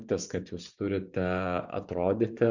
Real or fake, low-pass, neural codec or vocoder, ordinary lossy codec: fake; 7.2 kHz; vocoder, 24 kHz, 100 mel bands, Vocos; Opus, 64 kbps